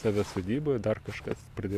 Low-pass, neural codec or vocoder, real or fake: 14.4 kHz; vocoder, 44.1 kHz, 128 mel bands every 512 samples, BigVGAN v2; fake